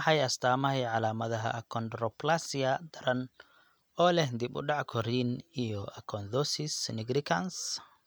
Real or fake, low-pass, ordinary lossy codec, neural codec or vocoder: real; none; none; none